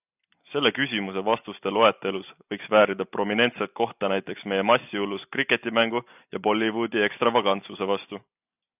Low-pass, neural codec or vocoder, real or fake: 3.6 kHz; none; real